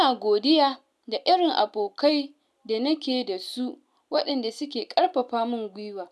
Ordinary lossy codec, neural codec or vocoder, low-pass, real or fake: none; none; none; real